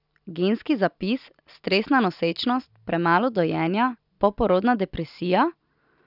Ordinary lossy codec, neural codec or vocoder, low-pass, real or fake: none; none; 5.4 kHz; real